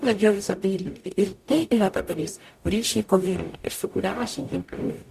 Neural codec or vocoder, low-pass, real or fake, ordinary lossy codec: codec, 44.1 kHz, 0.9 kbps, DAC; 14.4 kHz; fake; Opus, 64 kbps